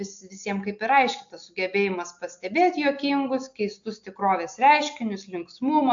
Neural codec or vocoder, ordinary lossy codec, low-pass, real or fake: none; AAC, 64 kbps; 7.2 kHz; real